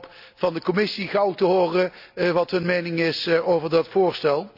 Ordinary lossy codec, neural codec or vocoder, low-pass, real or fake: none; none; 5.4 kHz; real